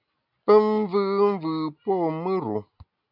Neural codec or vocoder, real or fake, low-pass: none; real; 5.4 kHz